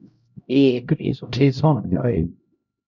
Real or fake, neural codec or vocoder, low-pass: fake; codec, 16 kHz, 0.5 kbps, X-Codec, HuBERT features, trained on LibriSpeech; 7.2 kHz